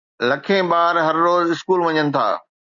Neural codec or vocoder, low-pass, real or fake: none; 7.2 kHz; real